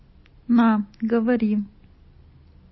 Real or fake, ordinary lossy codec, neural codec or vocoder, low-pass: real; MP3, 24 kbps; none; 7.2 kHz